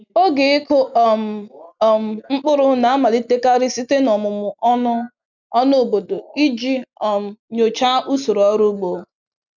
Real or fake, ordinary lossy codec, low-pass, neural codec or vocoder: real; none; 7.2 kHz; none